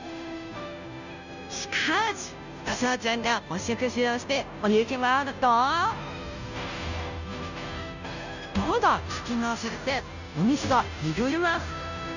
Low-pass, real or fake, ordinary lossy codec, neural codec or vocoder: 7.2 kHz; fake; none; codec, 16 kHz, 0.5 kbps, FunCodec, trained on Chinese and English, 25 frames a second